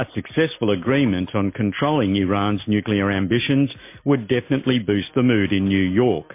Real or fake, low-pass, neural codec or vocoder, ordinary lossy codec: real; 3.6 kHz; none; MP3, 24 kbps